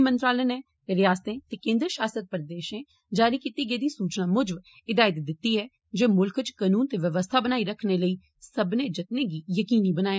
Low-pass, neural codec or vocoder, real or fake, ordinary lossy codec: none; none; real; none